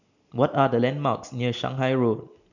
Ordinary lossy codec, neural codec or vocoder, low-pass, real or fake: none; none; 7.2 kHz; real